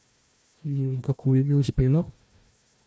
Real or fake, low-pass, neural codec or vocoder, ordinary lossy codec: fake; none; codec, 16 kHz, 1 kbps, FunCodec, trained on Chinese and English, 50 frames a second; none